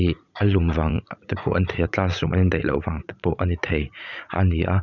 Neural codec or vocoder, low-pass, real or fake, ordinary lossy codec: vocoder, 44.1 kHz, 80 mel bands, Vocos; 7.2 kHz; fake; none